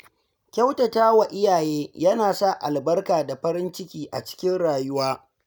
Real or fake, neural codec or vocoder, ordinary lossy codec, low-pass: real; none; none; none